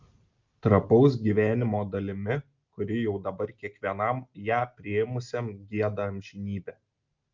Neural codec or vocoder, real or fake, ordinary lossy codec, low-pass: none; real; Opus, 24 kbps; 7.2 kHz